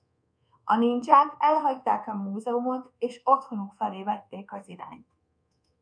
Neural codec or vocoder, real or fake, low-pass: codec, 24 kHz, 1.2 kbps, DualCodec; fake; 9.9 kHz